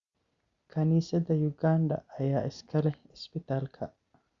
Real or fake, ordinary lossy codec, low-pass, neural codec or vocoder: real; Opus, 64 kbps; 7.2 kHz; none